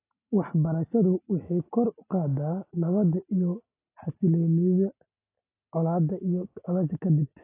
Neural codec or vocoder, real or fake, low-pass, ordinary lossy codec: none; real; 3.6 kHz; AAC, 24 kbps